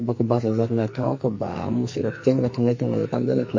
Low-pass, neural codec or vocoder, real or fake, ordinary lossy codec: 7.2 kHz; codec, 44.1 kHz, 2.6 kbps, SNAC; fake; MP3, 32 kbps